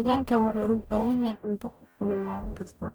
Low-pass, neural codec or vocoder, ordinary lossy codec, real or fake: none; codec, 44.1 kHz, 0.9 kbps, DAC; none; fake